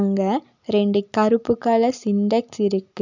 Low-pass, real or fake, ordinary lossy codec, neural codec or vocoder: 7.2 kHz; real; none; none